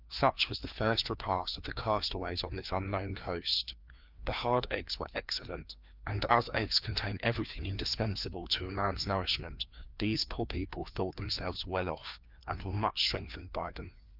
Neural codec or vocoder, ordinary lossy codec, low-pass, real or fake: codec, 16 kHz, 2 kbps, FreqCodec, larger model; Opus, 24 kbps; 5.4 kHz; fake